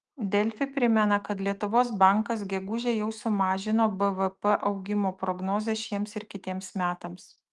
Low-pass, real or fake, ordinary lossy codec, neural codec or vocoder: 10.8 kHz; fake; Opus, 24 kbps; autoencoder, 48 kHz, 128 numbers a frame, DAC-VAE, trained on Japanese speech